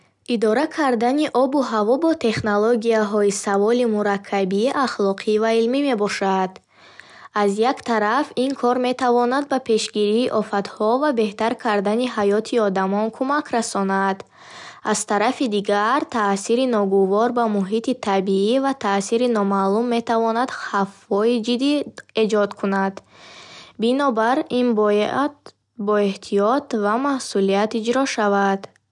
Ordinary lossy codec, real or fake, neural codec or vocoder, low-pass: none; real; none; 10.8 kHz